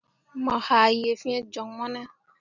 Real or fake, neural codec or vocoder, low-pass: real; none; 7.2 kHz